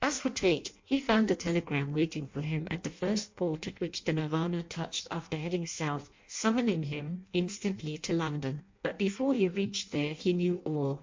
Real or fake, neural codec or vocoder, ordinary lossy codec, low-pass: fake; codec, 16 kHz in and 24 kHz out, 0.6 kbps, FireRedTTS-2 codec; MP3, 48 kbps; 7.2 kHz